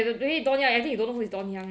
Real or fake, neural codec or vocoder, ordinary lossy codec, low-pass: real; none; none; none